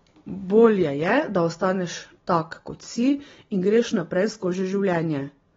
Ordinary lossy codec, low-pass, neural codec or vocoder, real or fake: AAC, 24 kbps; 7.2 kHz; none; real